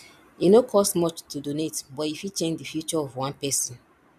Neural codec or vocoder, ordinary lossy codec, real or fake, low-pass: none; none; real; 14.4 kHz